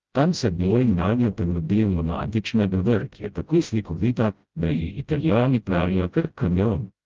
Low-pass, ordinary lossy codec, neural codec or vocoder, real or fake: 7.2 kHz; Opus, 32 kbps; codec, 16 kHz, 0.5 kbps, FreqCodec, smaller model; fake